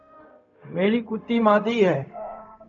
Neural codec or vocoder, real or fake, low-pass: codec, 16 kHz, 0.4 kbps, LongCat-Audio-Codec; fake; 7.2 kHz